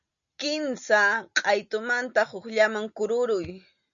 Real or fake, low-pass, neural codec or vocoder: real; 7.2 kHz; none